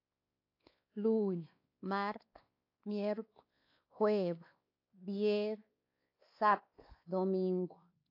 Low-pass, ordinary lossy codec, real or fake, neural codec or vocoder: 5.4 kHz; AAC, 32 kbps; fake; codec, 16 kHz, 2 kbps, X-Codec, WavLM features, trained on Multilingual LibriSpeech